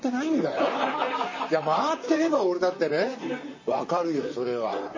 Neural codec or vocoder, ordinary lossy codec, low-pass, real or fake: vocoder, 44.1 kHz, 128 mel bands, Pupu-Vocoder; MP3, 32 kbps; 7.2 kHz; fake